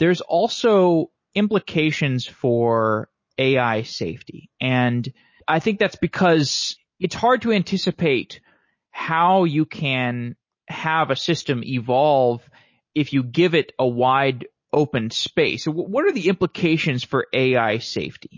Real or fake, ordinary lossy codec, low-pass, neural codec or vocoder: real; MP3, 32 kbps; 7.2 kHz; none